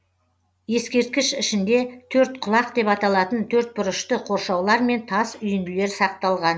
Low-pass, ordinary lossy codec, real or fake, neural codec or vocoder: none; none; real; none